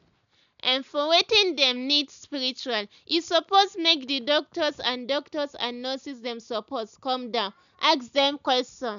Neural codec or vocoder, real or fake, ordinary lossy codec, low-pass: none; real; none; 7.2 kHz